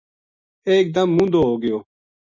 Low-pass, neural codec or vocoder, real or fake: 7.2 kHz; none; real